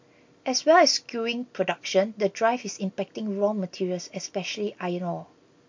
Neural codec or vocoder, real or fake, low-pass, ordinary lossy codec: none; real; 7.2 kHz; MP3, 48 kbps